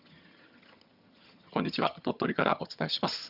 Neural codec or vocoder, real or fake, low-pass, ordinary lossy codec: vocoder, 22.05 kHz, 80 mel bands, HiFi-GAN; fake; 5.4 kHz; Opus, 64 kbps